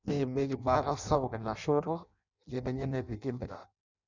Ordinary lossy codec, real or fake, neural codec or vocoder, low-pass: none; fake; codec, 16 kHz in and 24 kHz out, 0.6 kbps, FireRedTTS-2 codec; 7.2 kHz